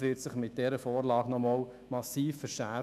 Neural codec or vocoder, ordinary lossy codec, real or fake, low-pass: autoencoder, 48 kHz, 128 numbers a frame, DAC-VAE, trained on Japanese speech; none; fake; 14.4 kHz